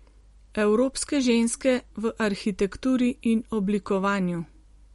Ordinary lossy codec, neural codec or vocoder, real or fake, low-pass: MP3, 48 kbps; none; real; 19.8 kHz